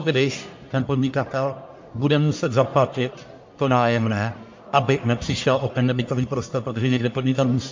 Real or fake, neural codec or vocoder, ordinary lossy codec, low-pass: fake; codec, 44.1 kHz, 1.7 kbps, Pupu-Codec; MP3, 48 kbps; 7.2 kHz